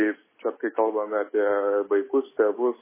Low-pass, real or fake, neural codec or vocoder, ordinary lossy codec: 3.6 kHz; real; none; MP3, 16 kbps